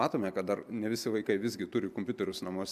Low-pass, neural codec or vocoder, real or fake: 14.4 kHz; none; real